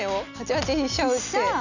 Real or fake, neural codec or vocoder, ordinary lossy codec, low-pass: real; none; none; 7.2 kHz